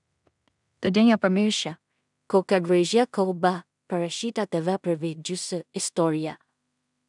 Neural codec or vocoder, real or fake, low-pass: codec, 16 kHz in and 24 kHz out, 0.4 kbps, LongCat-Audio-Codec, two codebook decoder; fake; 10.8 kHz